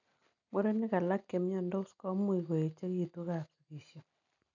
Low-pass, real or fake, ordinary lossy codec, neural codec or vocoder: 7.2 kHz; real; none; none